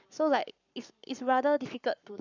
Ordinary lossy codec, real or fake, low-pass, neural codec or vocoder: none; fake; 7.2 kHz; codec, 44.1 kHz, 7.8 kbps, Pupu-Codec